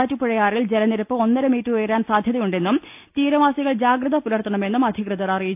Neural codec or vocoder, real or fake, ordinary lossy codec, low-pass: none; real; AAC, 32 kbps; 3.6 kHz